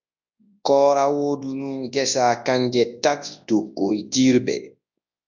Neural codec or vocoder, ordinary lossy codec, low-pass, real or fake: codec, 24 kHz, 0.9 kbps, WavTokenizer, large speech release; MP3, 64 kbps; 7.2 kHz; fake